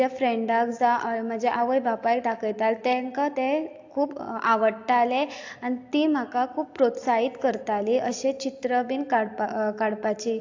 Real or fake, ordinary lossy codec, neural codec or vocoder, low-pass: real; AAC, 48 kbps; none; 7.2 kHz